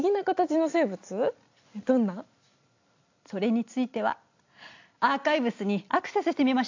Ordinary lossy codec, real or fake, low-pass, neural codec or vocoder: AAC, 48 kbps; real; 7.2 kHz; none